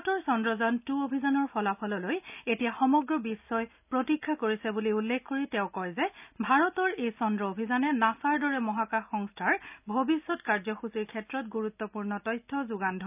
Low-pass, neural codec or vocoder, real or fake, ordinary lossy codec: 3.6 kHz; none; real; none